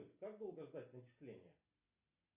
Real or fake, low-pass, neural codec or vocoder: real; 3.6 kHz; none